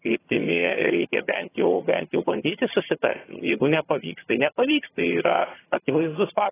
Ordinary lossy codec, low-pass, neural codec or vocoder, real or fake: AAC, 16 kbps; 3.6 kHz; vocoder, 22.05 kHz, 80 mel bands, HiFi-GAN; fake